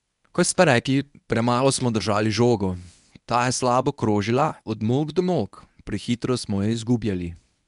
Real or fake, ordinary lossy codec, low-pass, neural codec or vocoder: fake; none; 10.8 kHz; codec, 24 kHz, 0.9 kbps, WavTokenizer, medium speech release version 1